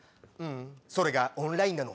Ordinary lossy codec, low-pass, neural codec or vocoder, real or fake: none; none; none; real